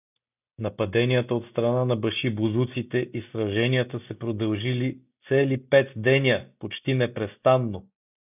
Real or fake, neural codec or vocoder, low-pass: real; none; 3.6 kHz